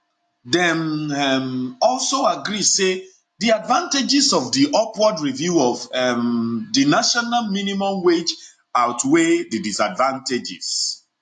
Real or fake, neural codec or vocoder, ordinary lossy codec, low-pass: real; none; AAC, 64 kbps; 10.8 kHz